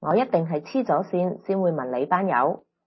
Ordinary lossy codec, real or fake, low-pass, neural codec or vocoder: MP3, 24 kbps; real; 7.2 kHz; none